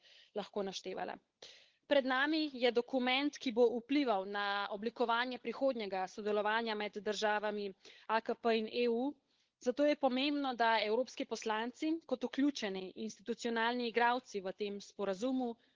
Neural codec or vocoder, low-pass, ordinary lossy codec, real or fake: codec, 16 kHz, 16 kbps, FunCodec, trained on LibriTTS, 50 frames a second; 7.2 kHz; Opus, 16 kbps; fake